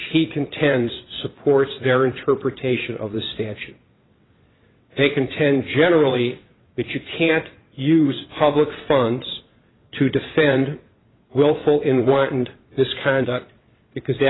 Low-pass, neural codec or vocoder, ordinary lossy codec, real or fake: 7.2 kHz; vocoder, 22.05 kHz, 80 mel bands, WaveNeXt; AAC, 16 kbps; fake